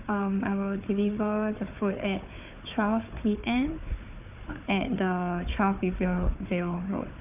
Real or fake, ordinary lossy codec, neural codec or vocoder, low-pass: fake; none; codec, 16 kHz, 4 kbps, FunCodec, trained on Chinese and English, 50 frames a second; 3.6 kHz